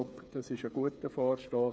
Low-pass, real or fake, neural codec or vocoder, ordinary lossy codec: none; fake; codec, 16 kHz, 8 kbps, FreqCodec, smaller model; none